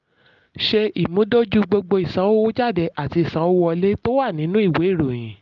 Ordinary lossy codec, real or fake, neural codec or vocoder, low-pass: Opus, 32 kbps; real; none; 7.2 kHz